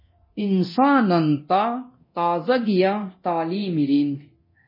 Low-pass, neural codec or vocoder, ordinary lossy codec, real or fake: 5.4 kHz; codec, 24 kHz, 0.9 kbps, DualCodec; MP3, 24 kbps; fake